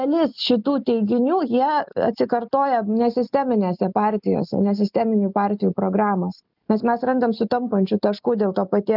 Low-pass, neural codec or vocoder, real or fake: 5.4 kHz; none; real